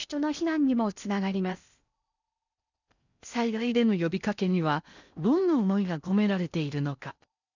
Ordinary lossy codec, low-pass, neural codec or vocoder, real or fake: none; 7.2 kHz; codec, 16 kHz in and 24 kHz out, 0.8 kbps, FocalCodec, streaming, 65536 codes; fake